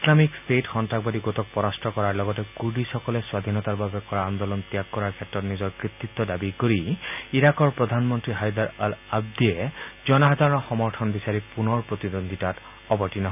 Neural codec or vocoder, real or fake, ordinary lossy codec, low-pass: none; real; none; 3.6 kHz